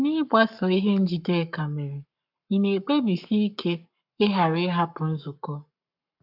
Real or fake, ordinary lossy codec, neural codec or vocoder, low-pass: fake; AAC, 48 kbps; codec, 44.1 kHz, 7.8 kbps, Pupu-Codec; 5.4 kHz